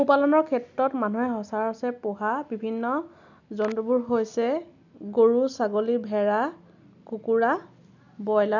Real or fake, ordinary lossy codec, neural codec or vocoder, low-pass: real; none; none; 7.2 kHz